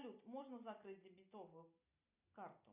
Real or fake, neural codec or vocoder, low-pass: real; none; 3.6 kHz